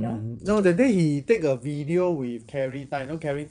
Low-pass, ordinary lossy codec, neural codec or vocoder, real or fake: 9.9 kHz; MP3, 96 kbps; vocoder, 22.05 kHz, 80 mel bands, Vocos; fake